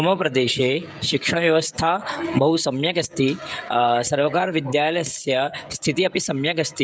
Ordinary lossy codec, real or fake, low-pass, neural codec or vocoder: none; fake; none; codec, 16 kHz, 16 kbps, FreqCodec, smaller model